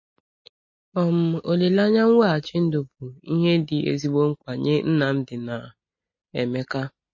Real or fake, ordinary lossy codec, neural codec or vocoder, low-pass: real; MP3, 32 kbps; none; 7.2 kHz